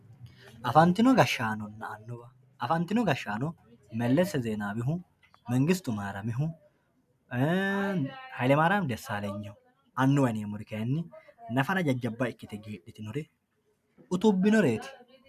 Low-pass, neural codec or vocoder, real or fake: 14.4 kHz; none; real